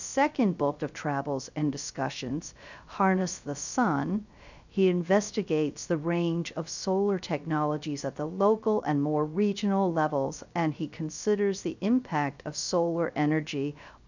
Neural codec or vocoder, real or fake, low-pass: codec, 16 kHz, 0.3 kbps, FocalCodec; fake; 7.2 kHz